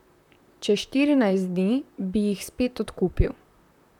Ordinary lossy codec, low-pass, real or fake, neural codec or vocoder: none; 19.8 kHz; fake; vocoder, 44.1 kHz, 128 mel bands, Pupu-Vocoder